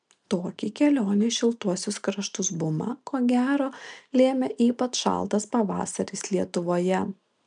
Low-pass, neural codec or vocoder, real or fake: 9.9 kHz; none; real